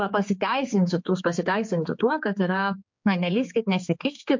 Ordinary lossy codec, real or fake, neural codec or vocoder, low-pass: MP3, 48 kbps; fake; codec, 16 kHz, 4 kbps, X-Codec, HuBERT features, trained on general audio; 7.2 kHz